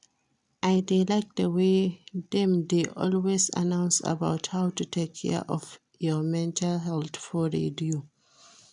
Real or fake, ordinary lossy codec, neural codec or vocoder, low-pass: real; none; none; 10.8 kHz